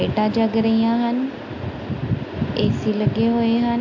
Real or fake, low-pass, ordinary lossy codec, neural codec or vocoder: real; 7.2 kHz; AAC, 48 kbps; none